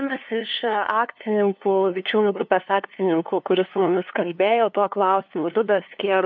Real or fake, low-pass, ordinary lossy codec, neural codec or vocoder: fake; 7.2 kHz; MP3, 64 kbps; codec, 16 kHz, 2 kbps, FunCodec, trained on LibriTTS, 25 frames a second